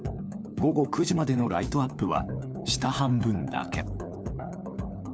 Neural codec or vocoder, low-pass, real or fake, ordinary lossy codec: codec, 16 kHz, 4 kbps, FunCodec, trained on LibriTTS, 50 frames a second; none; fake; none